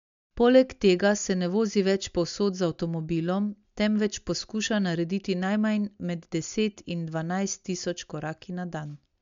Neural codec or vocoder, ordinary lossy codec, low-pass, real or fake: none; MP3, 64 kbps; 7.2 kHz; real